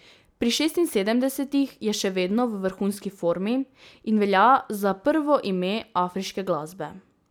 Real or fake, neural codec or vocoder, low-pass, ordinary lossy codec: real; none; none; none